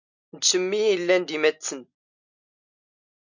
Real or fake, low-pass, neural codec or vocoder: real; 7.2 kHz; none